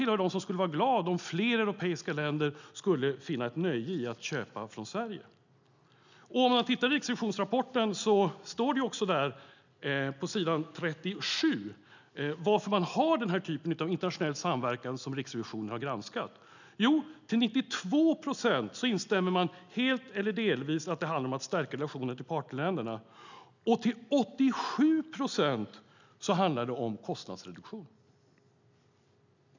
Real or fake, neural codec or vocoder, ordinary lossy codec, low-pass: real; none; none; 7.2 kHz